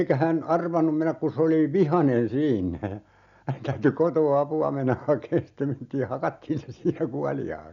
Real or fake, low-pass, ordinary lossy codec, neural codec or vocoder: real; 7.2 kHz; none; none